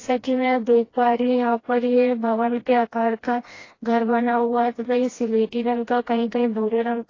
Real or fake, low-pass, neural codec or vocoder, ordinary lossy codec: fake; 7.2 kHz; codec, 16 kHz, 1 kbps, FreqCodec, smaller model; AAC, 32 kbps